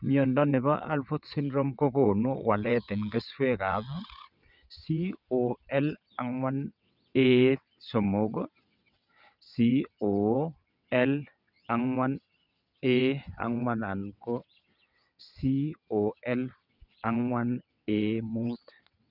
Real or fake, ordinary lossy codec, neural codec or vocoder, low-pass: fake; none; vocoder, 22.05 kHz, 80 mel bands, WaveNeXt; 5.4 kHz